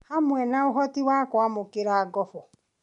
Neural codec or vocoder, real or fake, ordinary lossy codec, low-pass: none; real; none; 10.8 kHz